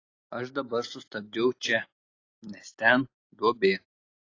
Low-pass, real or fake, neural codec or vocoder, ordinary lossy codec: 7.2 kHz; real; none; AAC, 32 kbps